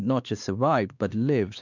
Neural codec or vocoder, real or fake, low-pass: codec, 16 kHz, 2 kbps, X-Codec, WavLM features, trained on Multilingual LibriSpeech; fake; 7.2 kHz